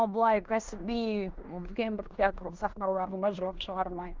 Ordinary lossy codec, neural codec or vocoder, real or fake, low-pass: Opus, 32 kbps; codec, 16 kHz in and 24 kHz out, 0.9 kbps, LongCat-Audio-Codec, fine tuned four codebook decoder; fake; 7.2 kHz